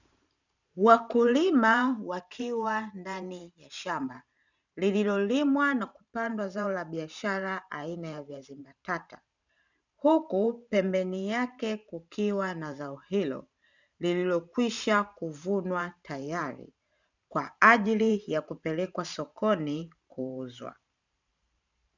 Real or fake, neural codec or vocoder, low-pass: fake; vocoder, 44.1 kHz, 128 mel bands every 512 samples, BigVGAN v2; 7.2 kHz